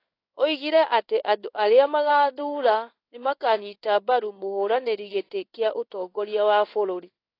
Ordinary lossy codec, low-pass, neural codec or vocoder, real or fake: AAC, 32 kbps; 5.4 kHz; codec, 16 kHz in and 24 kHz out, 1 kbps, XY-Tokenizer; fake